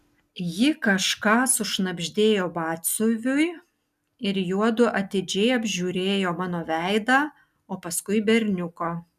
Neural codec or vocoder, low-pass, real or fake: none; 14.4 kHz; real